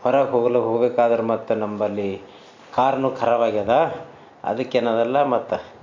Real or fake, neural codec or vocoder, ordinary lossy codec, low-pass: real; none; MP3, 64 kbps; 7.2 kHz